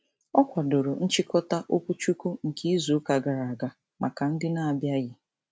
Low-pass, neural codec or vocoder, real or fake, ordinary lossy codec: none; none; real; none